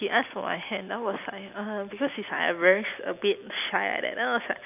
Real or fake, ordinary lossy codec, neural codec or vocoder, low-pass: real; none; none; 3.6 kHz